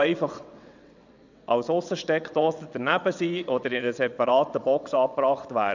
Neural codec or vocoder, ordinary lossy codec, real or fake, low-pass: vocoder, 22.05 kHz, 80 mel bands, WaveNeXt; none; fake; 7.2 kHz